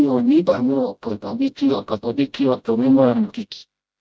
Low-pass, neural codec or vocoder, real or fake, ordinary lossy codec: none; codec, 16 kHz, 0.5 kbps, FreqCodec, smaller model; fake; none